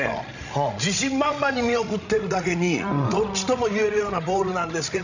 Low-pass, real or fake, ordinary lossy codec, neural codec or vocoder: 7.2 kHz; fake; none; codec, 16 kHz, 16 kbps, FreqCodec, larger model